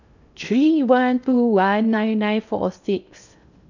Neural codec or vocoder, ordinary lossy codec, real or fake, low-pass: codec, 16 kHz in and 24 kHz out, 0.6 kbps, FocalCodec, streaming, 2048 codes; none; fake; 7.2 kHz